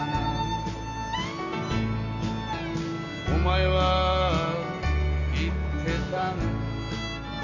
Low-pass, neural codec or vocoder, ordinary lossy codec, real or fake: 7.2 kHz; none; none; real